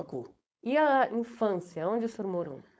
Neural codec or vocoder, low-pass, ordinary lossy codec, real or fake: codec, 16 kHz, 4.8 kbps, FACodec; none; none; fake